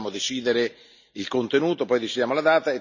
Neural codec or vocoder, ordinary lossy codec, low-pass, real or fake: none; none; 7.2 kHz; real